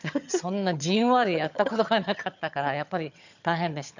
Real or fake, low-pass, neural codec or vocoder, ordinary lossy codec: fake; 7.2 kHz; vocoder, 22.05 kHz, 80 mel bands, HiFi-GAN; none